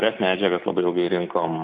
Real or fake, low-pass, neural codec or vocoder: real; 9.9 kHz; none